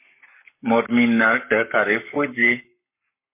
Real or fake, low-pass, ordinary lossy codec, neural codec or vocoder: fake; 3.6 kHz; MP3, 24 kbps; codec, 44.1 kHz, 7.8 kbps, Pupu-Codec